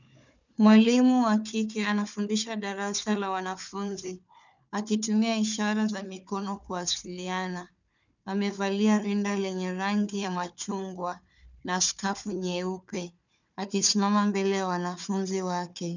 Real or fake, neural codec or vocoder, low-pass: fake; codec, 16 kHz, 4 kbps, FunCodec, trained on Chinese and English, 50 frames a second; 7.2 kHz